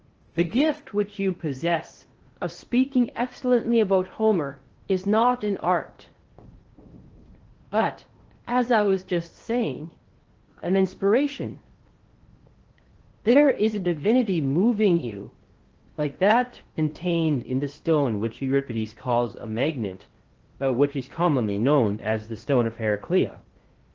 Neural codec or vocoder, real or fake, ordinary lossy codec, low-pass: codec, 16 kHz in and 24 kHz out, 0.8 kbps, FocalCodec, streaming, 65536 codes; fake; Opus, 16 kbps; 7.2 kHz